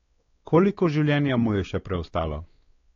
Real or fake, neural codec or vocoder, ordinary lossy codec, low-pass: fake; codec, 16 kHz, 4 kbps, X-Codec, WavLM features, trained on Multilingual LibriSpeech; AAC, 32 kbps; 7.2 kHz